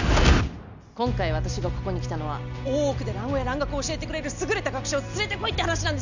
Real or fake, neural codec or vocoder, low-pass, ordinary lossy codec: real; none; 7.2 kHz; none